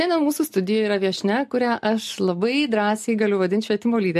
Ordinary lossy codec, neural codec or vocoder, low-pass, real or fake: MP3, 64 kbps; none; 14.4 kHz; real